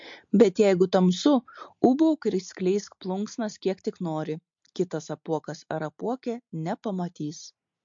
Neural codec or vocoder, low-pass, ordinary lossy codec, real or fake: none; 7.2 kHz; MP3, 48 kbps; real